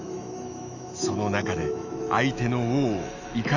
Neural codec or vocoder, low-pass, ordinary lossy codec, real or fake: autoencoder, 48 kHz, 128 numbers a frame, DAC-VAE, trained on Japanese speech; 7.2 kHz; none; fake